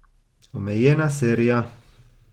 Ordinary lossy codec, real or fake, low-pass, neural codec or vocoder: Opus, 16 kbps; real; 19.8 kHz; none